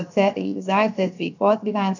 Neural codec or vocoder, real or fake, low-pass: codec, 16 kHz, 0.7 kbps, FocalCodec; fake; 7.2 kHz